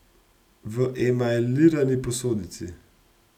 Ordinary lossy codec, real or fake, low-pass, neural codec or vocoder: none; real; 19.8 kHz; none